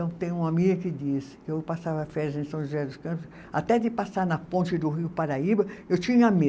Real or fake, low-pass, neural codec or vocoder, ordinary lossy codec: real; none; none; none